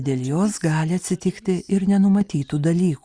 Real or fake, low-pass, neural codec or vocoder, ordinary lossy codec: real; 9.9 kHz; none; Opus, 64 kbps